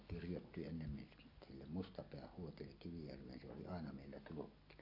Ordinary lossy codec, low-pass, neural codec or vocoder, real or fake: AAC, 48 kbps; 5.4 kHz; none; real